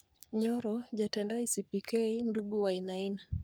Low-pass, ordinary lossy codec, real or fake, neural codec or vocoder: none; none; fake; codec, 44.1 kHz, 3.4 kbps, Pupu-Codec